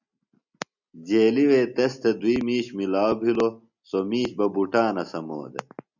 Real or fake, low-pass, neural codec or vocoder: real; 7.2 kHz; none